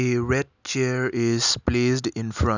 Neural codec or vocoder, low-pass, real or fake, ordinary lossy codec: none; 7.2 kHz; real; none